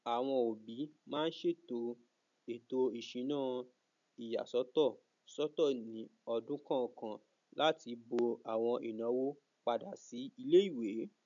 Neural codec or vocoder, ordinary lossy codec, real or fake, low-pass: none; none; real; 7.2 kHz